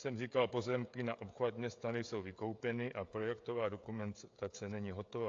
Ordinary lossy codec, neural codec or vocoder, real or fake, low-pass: AAC, 48 kbps; codec, 16 kHz, 16 kbps, FreqCodec, smaller model; fake; 7.2 kHz